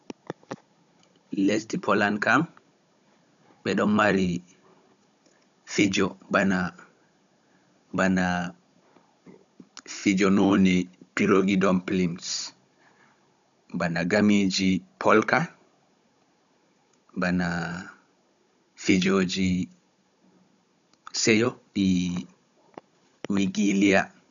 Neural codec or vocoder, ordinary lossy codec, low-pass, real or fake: codec, 16 kHz, 16 kbps, FunCodec, trained on Chinese and English, 50 frames a second; MP3, 96 kbps; 7.2 kHz; fake